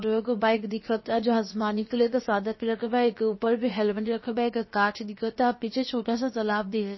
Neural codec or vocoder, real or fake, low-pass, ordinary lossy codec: codec, 16 kHz, about 1 kbps, DyCAST, with the encoder's durations; fake; 7.2 kHz; MP3, 24 kbps